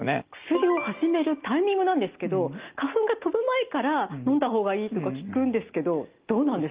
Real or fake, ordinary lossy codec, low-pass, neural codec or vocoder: real; Opus, 32 kbps; 3.6 kHz; none